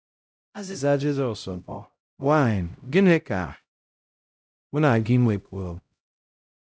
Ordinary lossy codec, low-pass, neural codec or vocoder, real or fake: none; none; codec, 16 kHz, 0.5 kbps, X-Codec, HuBERT features, trained on LibriSpeech; fake